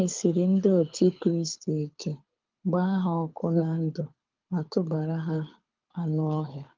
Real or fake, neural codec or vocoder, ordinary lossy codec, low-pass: fake; codec, 24 kHz, 6 kbps, HILCodec; Opus, 32 kbps; 7.2 kHz